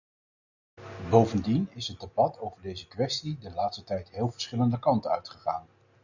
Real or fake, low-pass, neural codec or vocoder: real; 7.2 kHz; none